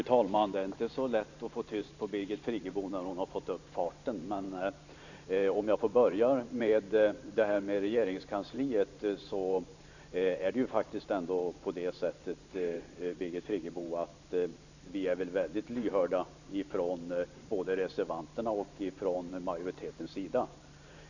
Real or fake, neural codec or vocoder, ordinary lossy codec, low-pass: fake; vocoder, 44.1 kHz, 128 mel bands every 512 samples, BigVGAN v2; none; 7.2 kHz